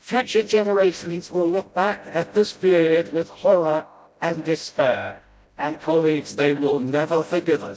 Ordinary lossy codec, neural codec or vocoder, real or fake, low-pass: none; codec, 16 kHz, 0.5 kbps, FreqCodec, smaller model; fake; none